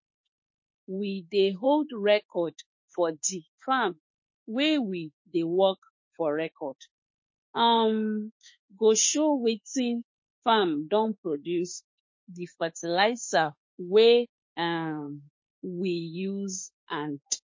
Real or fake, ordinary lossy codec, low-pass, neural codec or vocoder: fake; MP3, 32 kbps; 7.2 kHz; autoencoder, 48 kHz, 32 numbers a frame, DAC-VAE, trained on Japanese speech